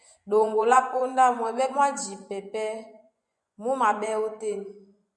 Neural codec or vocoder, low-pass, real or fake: vocoder, 24 kHz, 100 mel bands, Vocos; 10.8 kHz; fake